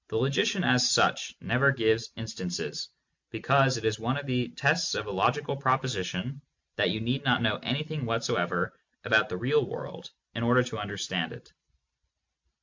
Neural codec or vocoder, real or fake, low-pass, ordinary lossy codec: none; real; 7.2 kHz; MP3, 48 kbps